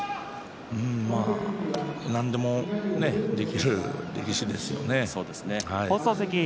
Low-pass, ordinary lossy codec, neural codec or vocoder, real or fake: none; none; none; real